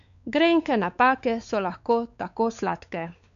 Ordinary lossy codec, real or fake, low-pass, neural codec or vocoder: none; fake; 7.2 kHz; codec, 16 kHz, 4 kbps, X-Codec, WavLM features, trained on Multilingual LibriSpeech